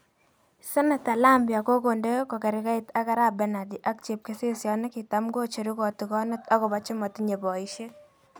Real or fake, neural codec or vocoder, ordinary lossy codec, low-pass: real; none; none; none